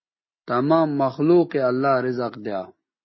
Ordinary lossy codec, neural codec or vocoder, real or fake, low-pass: MP3, 24 kbps; none; real; 7.2 kHz